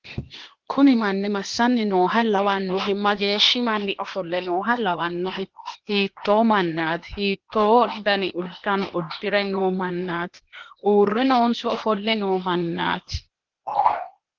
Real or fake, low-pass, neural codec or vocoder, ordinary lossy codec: fake; 7.2 kHz; codec, 16 kHz, 0.8 kbps, ZipCodec; Opus, 16 kbps